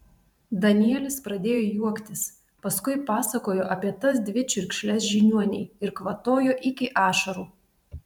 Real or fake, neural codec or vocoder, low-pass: fake; vocoder, 44.1 kHz, 128 mel bands every 512 samples, BigVGAN v2; 19.8 kHz